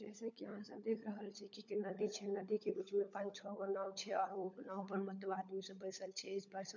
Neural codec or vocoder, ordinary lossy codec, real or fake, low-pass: codec, 16 kHz, 16 kbps, FunCodec, trained on LibriTTS, 50 frames a second; none; fake; 7.2 kHz